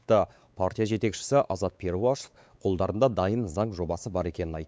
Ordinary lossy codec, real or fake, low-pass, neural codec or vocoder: none; fake; none; codec, 16 kHz, 4 kbps, X-Codec, WavLM features, trained on Multilingual LibriSpeech